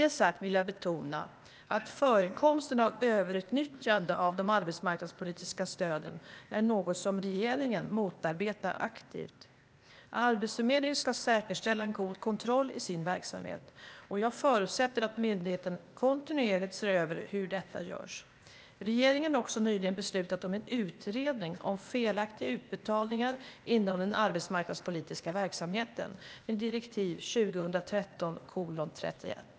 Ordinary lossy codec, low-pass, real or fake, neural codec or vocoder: none; none; fake; codec, 16 kHz, 0.8 kbps, ZipCodec